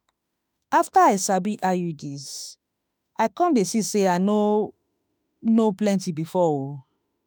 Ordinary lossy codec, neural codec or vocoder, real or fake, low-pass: none; autoencoder, 48 kHz, 32 numbers a frame, DAC-VAE, trained on Japanese speech; fake; none